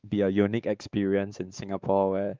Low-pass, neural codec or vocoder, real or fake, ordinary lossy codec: 7.2 kHz; codec, 16 kHz, 4 kbps, X-Codec, WavLM features, trained on Multilingual LibriSpeech; fake; Opus, 32 kbps